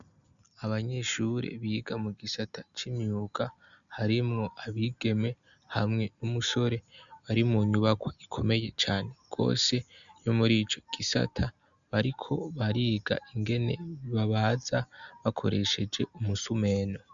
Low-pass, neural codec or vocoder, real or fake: 7.2 kHz; none; real